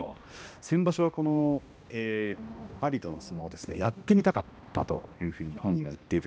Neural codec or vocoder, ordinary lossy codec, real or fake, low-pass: codec, 16 kHz, 1 kbps, X-Codec, HuBERT features, trained on balanced general audio; none; fake; none